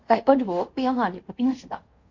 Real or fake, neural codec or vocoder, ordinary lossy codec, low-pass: fake; codec, 24 kHz, 0.5 kbps, DualCodec; MP3, 48 kbps; 7.2 kHz